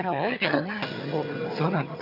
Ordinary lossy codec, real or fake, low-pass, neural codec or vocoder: none; fake; 5.4 kHz; vocoder, 22.05 kHz, 80 mel bands, HiFi-GAN